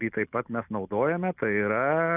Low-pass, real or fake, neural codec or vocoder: 3.6 kHz; real; none